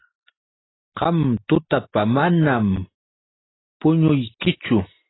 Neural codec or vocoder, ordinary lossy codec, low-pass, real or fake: none; AAC, 16 kbps; 7.2 kHz; real